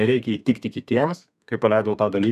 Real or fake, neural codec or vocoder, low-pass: fake; codec, 32 kHz, 1.9 kbps, SNAC; 14.4 kHz